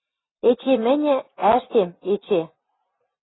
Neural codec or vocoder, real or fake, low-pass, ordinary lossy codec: none; real; 7.2 kHz; AAC, 16 kbps